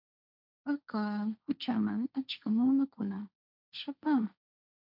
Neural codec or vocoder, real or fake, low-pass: codec, 16 kHz, 1.1 kbps, Voila-Tokenizer; fake; 5.4 kHz